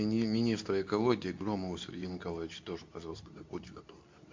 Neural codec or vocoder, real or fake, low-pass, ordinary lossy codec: codec, 24 kHz, 0.9 kbps, WavTokenizer, medium speech release version 2; fake; 7.2 kHz; MP3, 64 kbps